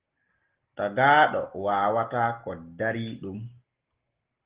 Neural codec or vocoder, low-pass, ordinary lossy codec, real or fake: none; 3.6 kHz; Opus, 16 kbps; real